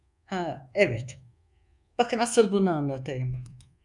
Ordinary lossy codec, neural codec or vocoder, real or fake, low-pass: MP3, 96 kbps; codec, 24 kHz, 1.2 kbps, DualCodec; fake; 10.8 kHz